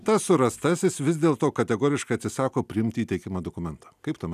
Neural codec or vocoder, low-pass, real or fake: none; 14.4 kHz; real